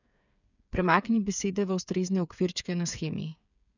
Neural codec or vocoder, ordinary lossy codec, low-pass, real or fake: codec, 16 kHz, 8 kbps, FreqCodec, smaller model; none; 7.2 kHz; fake